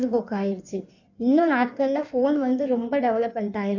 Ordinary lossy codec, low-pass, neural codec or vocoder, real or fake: none; 7.2 kHz; codec, 16 kHz in and 24 kHz out, 1.1 kbps, FireRedTTS-2 codec; fake